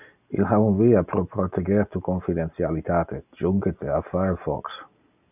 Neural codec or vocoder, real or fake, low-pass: none; real; 3.6 kHz